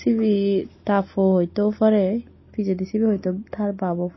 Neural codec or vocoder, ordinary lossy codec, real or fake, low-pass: none; MP3, 24 kbps; real; 7.2 kHz